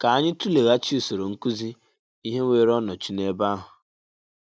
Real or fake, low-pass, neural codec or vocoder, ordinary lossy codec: real; none; none; none